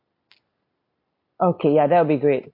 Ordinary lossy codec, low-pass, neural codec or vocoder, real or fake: MP3, 32 kbps; 5.4 kHz; none; real